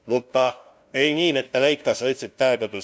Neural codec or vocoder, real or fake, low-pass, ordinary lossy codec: codec, 16 kHz, 0.5 kbps, FunCodec, trained on LibriTTS, 25 frames a second; fake; none; none